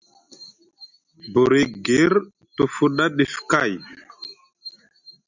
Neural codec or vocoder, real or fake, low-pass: none; real; 7.2 kHz